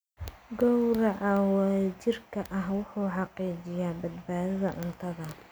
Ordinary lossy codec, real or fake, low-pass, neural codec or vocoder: none; real; none; none